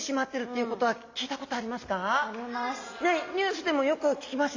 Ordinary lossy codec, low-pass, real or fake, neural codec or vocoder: AAC, 48 kbps; 7.2 kHz; real; none